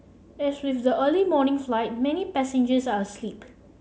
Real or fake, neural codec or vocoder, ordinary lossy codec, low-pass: real; none; none; none